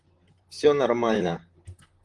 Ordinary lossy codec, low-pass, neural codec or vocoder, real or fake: Opus, 24 kbps; 10.8 kHz; vocoder, 44.1 kHz, 128 mel bands, Pupu-Vocoder; fake